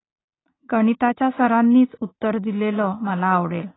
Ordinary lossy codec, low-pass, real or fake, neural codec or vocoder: AAC, 16 kbps; 7.2 kHz; real; none